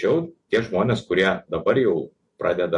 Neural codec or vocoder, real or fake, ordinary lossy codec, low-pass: none; real; MP3, 48 kbps; 10.8 kHz